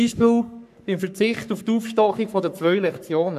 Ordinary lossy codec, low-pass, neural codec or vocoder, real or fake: none; 14.4 kHz; codec, 44.1 kHz, 3.4 kbps, Pupu-Codec; fake